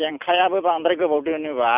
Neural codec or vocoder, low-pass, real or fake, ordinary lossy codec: vocoder, 44.1 kHz, 128 mel bands every 256 samples, BigVGAN v2; 3.6 kHz; fake; none